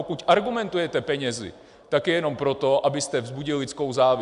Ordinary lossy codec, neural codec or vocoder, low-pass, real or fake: AAC, 96 kbps; none; 10.8 kHz; real